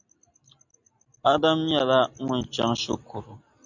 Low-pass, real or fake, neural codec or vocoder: 7.2 kHz; real; none